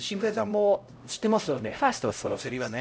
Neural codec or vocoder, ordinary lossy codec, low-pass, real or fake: codec, 16 kHz, 0.5 kbps, X-Codec, HuBERT features, trained on LibriSpeech; none; none; fake